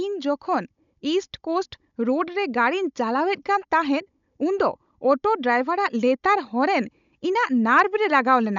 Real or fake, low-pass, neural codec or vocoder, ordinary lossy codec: fake; 7.2 kHz; codec, 16 kHz, 16 kbps, FunCodec, trained on Chinese and English, 50 frames a second; none